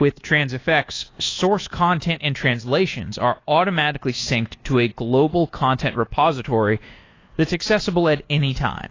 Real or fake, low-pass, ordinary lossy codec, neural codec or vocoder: fake; 7.2 kHz; AAC, 32 kbps; codec, 24 kHz, 1.2 kbps, DualCodec